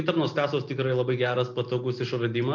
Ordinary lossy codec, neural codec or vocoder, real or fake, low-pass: MP3, 64 kbps; none; real; 7.2 kHz